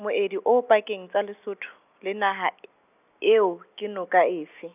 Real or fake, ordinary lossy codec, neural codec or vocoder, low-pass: real; none; none; 3.6 kHz